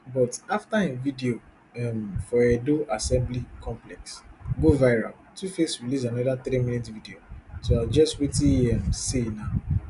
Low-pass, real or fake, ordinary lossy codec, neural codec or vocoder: 10.8 kHz; real; none; none